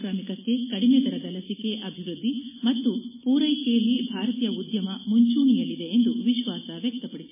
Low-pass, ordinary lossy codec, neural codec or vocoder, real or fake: 3.6 kHz; MP3, 16 kbps; none; real